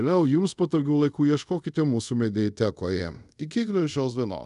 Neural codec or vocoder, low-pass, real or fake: codec, 24 kHz, 0.5 kbps, DualCodec; 10.8 kHz; fake